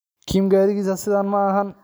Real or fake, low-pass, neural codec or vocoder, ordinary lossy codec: real; none; none; none